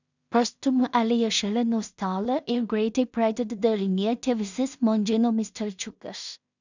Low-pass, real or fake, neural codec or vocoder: 7.2 kHz; fake; codec, 16 kHz in and 24 kHz out, 0.4 kbps, LongCat-Audio-Codec, two codebook decoder